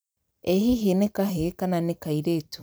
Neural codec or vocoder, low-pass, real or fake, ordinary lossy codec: vocoder, 44.1 kHz, 128 mel bands every 256 samples, BigVGAN v2; none; fake; none